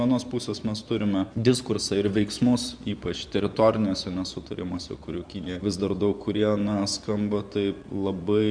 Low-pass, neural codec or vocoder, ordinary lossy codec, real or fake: 9.9 kHz; vocoder, 24 kHz, 100 mel bands, Vocos; Opus, 64 kbps; fake